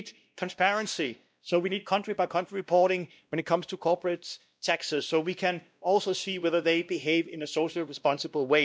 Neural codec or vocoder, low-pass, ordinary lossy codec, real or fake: codec, 16 kHz, 1 kbps, X-Codec, WavLM features, trained on Multilingual LibriSpeech; none; none; fake